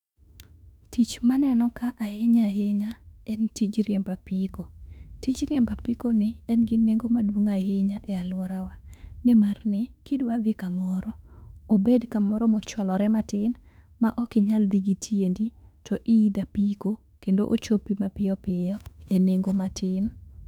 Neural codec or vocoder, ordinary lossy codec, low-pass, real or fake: autoencoder, 48 kHz, 32 numbers a frame, DAC-VAE, trained on Japanese speech; none; 19.8 kHz; fake